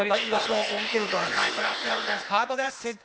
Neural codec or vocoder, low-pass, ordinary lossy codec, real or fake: codec, 16 kHz, 0.8 kbps, ZipCodec; none; none; fake